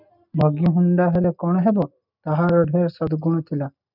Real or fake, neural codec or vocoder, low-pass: real; none; 5.4 kHz